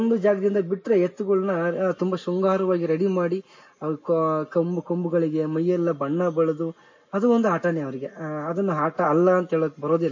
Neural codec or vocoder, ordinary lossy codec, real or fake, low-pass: none; MP3, 32 kbps; real; 7.2 kHz